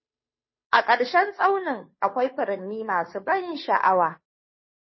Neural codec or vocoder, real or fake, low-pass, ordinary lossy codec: codec, 16 kHz, 2 kbps, FunCodec, trained on Chinese and English, 25 frames a second; fake; 7.2 kHz; MP3, 24 kbps